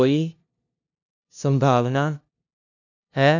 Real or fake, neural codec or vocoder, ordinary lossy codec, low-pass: fake; codec, 16 kHz, 0.5 kbps, FunCodec, trained on LibriTTS, 25 frames a second; none; 7.2 kHz